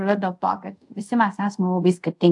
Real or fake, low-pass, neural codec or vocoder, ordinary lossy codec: fake; 10.8 kHz; codec, 24 kHz, 0.5 kbps, DualCodec; AAC, 64 kbps